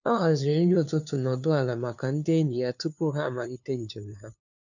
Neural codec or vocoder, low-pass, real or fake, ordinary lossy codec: codec, 16 kHz, 2 kbps, FunCodec, trained on LibriTTS, 25 frames a second; 7.2 kHz; fake; none